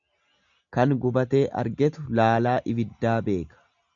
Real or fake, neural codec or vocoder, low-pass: real; none; 7.2 kHz